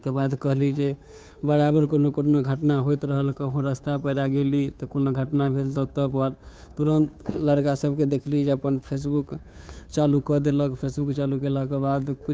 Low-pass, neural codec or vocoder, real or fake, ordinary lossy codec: none; codec, 16 kHz, 2 kbps, FunCodec, trained on Chinese and English, 25 frames a second; fake; none